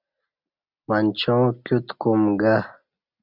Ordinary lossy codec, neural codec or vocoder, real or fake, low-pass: Opus, 64 kbps; none; real; 5.4 kHz